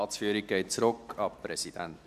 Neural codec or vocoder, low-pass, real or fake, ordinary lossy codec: none; 14.4 kHz; real; none